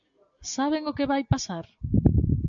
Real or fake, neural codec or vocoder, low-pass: real; none; 7.2 kHz